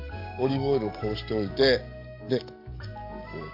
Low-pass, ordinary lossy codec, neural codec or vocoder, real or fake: 5.4 kHz; MP3, 48 kbps; codec, 44.1 kHz, 7.8 kbps, DAC; fake